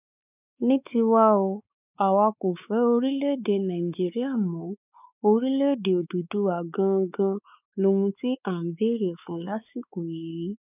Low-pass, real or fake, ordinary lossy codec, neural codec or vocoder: 3.6 kHz; fake; none; codec, 16 kHz, 4 kbps, X-Codec, WavLM features, trained on Multilingual LibriSpeech